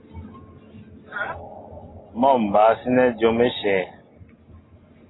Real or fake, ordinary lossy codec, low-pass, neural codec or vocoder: real; AAC, 16 kbps; 7.2 kHz; none